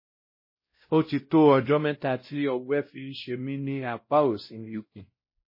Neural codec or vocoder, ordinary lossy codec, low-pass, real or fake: codec, 16 kHz, 0.5 kbps, X-Codec, WavLM features, trained on Multilingual LibriSpeech; MP3, 24 kbps; 5.4 kHz; fake